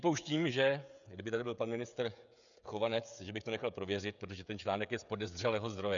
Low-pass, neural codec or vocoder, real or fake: 7.2 kHz; codec, 16 kHz, 16 kbps, FreqCodec, smaller model; fake